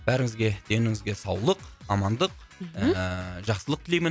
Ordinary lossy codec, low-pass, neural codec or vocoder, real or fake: none; none; none; real